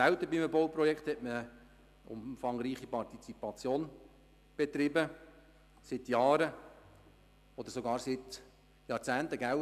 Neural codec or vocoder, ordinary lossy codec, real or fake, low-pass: none; none; real; 14.4 kHz